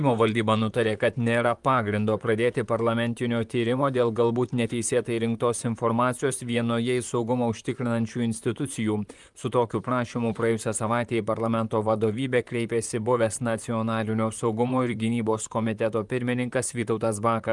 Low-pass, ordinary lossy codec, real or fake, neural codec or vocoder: 10.8 kHz; Opus, 32 kbps; fake; vocoder, 44.1 kHz, 128 mel bands, Pupu-Vocoder